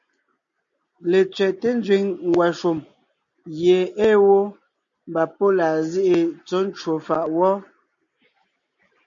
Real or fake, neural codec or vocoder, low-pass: real; none; 7.2 kHz